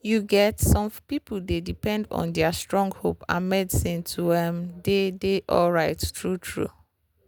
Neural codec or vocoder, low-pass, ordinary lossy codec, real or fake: none; none; none; real